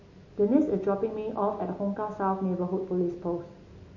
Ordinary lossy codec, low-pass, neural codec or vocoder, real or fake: MP3, 32 kbps; 7.2 kHz; none; real